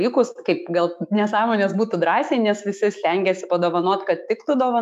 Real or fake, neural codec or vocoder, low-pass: fake; autoencoder, 48 kHz, 128 numbers a frame, DAC-VAE, trained on Japanese speech; 14.4 kHz